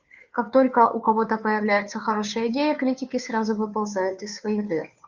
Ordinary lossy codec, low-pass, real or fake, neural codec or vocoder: Opus, 64 kbps; 7.2 kHz; fake; codec, 16 kHz, 2 kbps, FunCodec, trained on Chinese and English, 25 frames a second